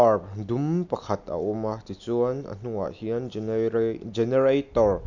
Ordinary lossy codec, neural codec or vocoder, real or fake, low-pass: none; none; real; 7.2 kHz